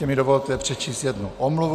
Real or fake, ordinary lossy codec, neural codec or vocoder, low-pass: real; AAC, 96 kbps; none; 14.4 kHz